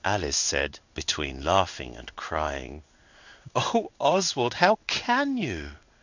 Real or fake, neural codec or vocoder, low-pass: fake; codec, 16 kHz in and 24 kHz out, 1 kbps, XY-Tokenizer; 7.2 kHz